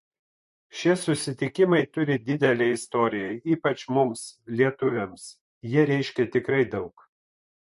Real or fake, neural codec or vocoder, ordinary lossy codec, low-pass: fake; vocoder, 44.1 kHz, 128 mel bands, Pupu-Vocoder; MP3, 48 kbps; 14.4 kHz